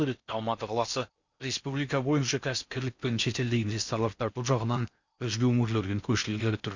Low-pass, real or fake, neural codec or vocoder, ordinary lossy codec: 7.2 kHz; fake; codec, 16 kHz in and 24 kHz out, 0.6 kbps, FocalCodec, streaming, 2048 codes; Opus, 64 kbps